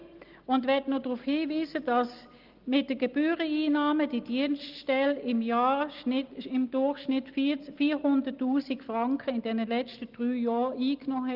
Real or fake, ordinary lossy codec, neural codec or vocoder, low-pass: real; Opus, 32 kbps; none; 5.4 kHz